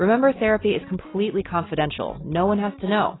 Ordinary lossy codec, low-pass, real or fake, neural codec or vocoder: AAC, 16 kbps; 7.2 kHz; real; none